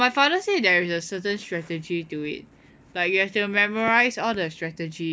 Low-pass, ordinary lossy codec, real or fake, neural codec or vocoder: none; none; real; none